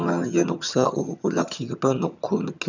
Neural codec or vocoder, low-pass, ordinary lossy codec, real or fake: vocoder, 22.05 kHz, 80 mel bands, HiFi-GAN; 7.2 kHz; none; fake